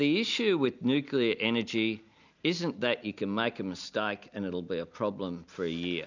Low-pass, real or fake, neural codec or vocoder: 7.2 kHz; real; none